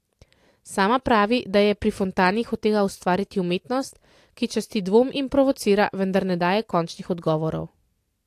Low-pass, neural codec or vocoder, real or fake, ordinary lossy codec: 14.4 kHz; none; real; AAC, 64 kbps